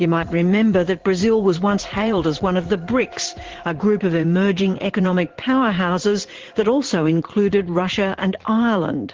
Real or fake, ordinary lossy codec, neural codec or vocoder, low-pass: fake; Opus, 16 kbps; vocoder, 44.1 kHz, 80 mel bands, Vocos; 7.2 kHz